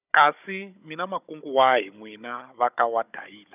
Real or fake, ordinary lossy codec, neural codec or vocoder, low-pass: fake; none; codec, 16 kHz, 16 kbps, FunCodec, trained on Chinese and English, 50 frames a second; 3.6 kHz